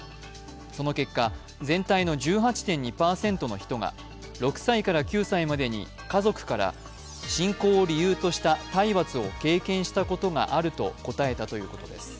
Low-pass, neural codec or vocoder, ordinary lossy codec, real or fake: none; none; none; real